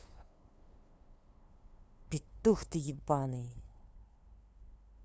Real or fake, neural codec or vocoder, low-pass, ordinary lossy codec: fake; codec, 16 kHz, 2 kbps, FunCodec, trained on LibriTTS, 25 frames a second; none; none